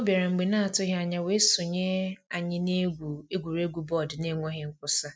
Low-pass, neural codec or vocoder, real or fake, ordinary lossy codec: none; none; real; none